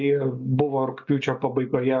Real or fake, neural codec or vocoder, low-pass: real; none; 7.2 kHz